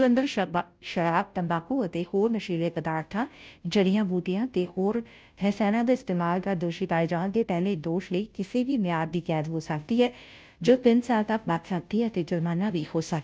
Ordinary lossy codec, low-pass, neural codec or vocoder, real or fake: none; none; codec, 16 kHz, 0.5 kbps, FunCodec, trained on Chinese and English, 25 frames a second; fake